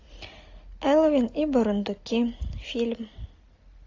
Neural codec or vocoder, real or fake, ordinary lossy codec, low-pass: none; real; AAC, 48 kbps; 7.2 kHz